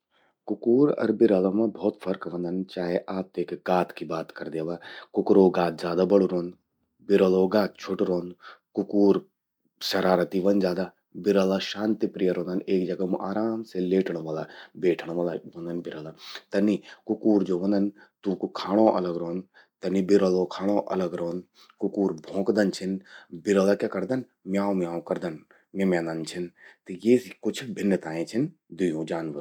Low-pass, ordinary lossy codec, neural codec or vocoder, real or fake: 19.8 kHz; none; none; real